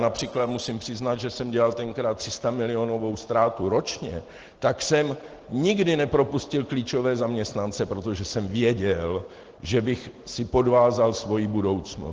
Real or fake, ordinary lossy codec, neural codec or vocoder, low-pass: real; Opus, 16 kbps; none; 7.2 kHz